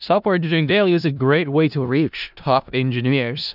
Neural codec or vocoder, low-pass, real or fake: codec, 16 kHz in and 24 kHz out, 0.4 kbps, LongCat-Audio-Codec, four codebook decoder; 5.4 kHz; fake